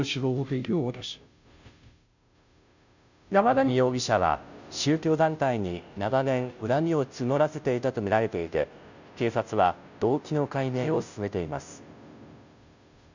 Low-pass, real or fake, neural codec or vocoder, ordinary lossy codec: 7.2 kHz; fake; codec, 16 kHz, 0.5 kbps, FunCodec, trained on Chinese and English, 25 frames a second; none